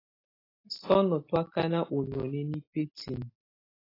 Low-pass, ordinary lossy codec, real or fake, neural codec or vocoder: 5.4 kHz; AAC, 24 kbps; real; none